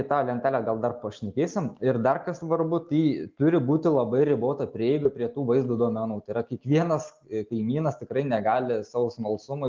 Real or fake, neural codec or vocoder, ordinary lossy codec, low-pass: real; none; Opus, 32 kbps; 7.2 kHz